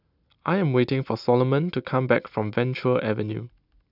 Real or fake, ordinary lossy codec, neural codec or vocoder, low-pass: real; none; none; 5.4 kHz